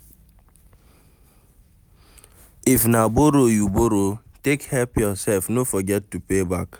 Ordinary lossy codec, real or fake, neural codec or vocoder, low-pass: none; real; none; none